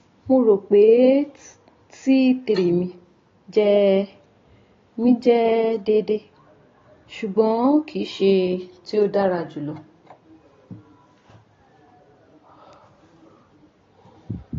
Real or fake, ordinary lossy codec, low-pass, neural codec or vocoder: real; AAC, 32 kbps; 7.2 kHz; none